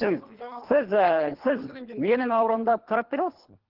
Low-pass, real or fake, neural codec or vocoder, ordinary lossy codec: 5.4 kHz; fake; codec, 24 kHz, 3 kbps, HILCodec; Opus, 16 kbps